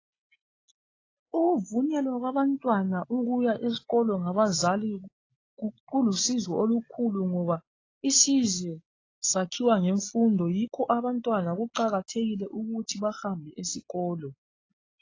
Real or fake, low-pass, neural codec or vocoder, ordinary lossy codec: real; 7.2 kHz; none; AAC, 32 kbps